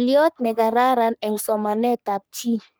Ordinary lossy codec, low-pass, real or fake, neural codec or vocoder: none; none; fake; codec, 44.1 kHz, 3.4 kbps, Pupu-Codec